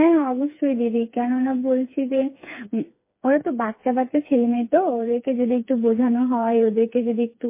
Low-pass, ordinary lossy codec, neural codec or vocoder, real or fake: 3.6 kHz; MP3, 24 kbps; codec, 16 kHz, 4 kbps, FreqCodec, smaller model; fake